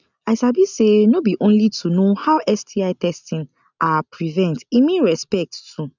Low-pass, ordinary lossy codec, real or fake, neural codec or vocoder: 7.2 kHz; none; real; none